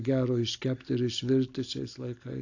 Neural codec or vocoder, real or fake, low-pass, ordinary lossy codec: none; real; 7.2 kHz; AAC, 48 kbps